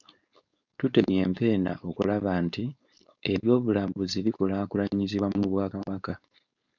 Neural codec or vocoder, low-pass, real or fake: codec, 16 kHz, 4.8 kbps, FACodec; 7.2 kHz; fake